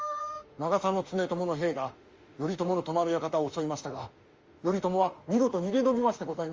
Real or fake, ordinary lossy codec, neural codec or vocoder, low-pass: fake; Opus, 32 kbps; autoencoder, 48 kHz, 32 numbers a frame, DAC-VAE, trained on Japanese speech; 7.2 kHz